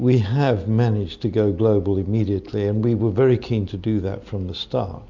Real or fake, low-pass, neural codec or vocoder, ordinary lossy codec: real; 7.2 kHz; none; MP3, 64 kbps